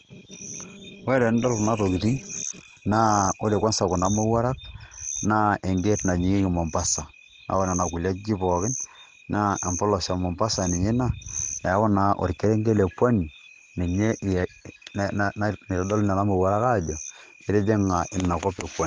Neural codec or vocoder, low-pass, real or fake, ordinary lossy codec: none; 7.2 kHz; real; Opus, 16 kbps